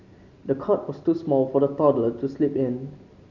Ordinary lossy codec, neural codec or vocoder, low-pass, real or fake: none; none; 7.2 kHz; real